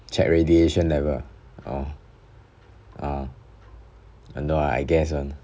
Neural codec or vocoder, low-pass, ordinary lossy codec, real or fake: none; none; none; real